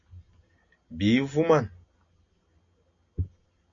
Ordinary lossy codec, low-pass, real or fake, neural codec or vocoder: MP3, 96 kbps; 7.2 kHz; real; none